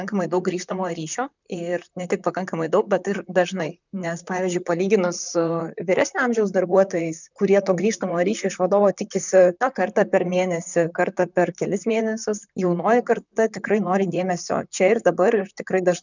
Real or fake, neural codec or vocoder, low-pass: fake; vocoder, 44.1 kHz, 128 mel bands, Pupu-Vocoder; 7.2 kHz